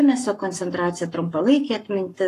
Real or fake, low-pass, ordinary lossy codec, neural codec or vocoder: fake; 14.4 kHz; AAC, 48 kbps; codec, 44.1 kHz, 7.8 kbps, Pupu-Codec